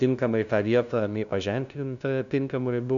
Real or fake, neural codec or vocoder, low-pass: fake; codec, 16 kHz, 0.5 kbps, FunCodec, trained on LibriTTS, 25 frames a second; 7.2 kHz